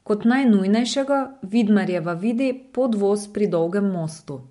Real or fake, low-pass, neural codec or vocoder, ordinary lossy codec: real; 10.8 kHz; none; MP3, 64 kbps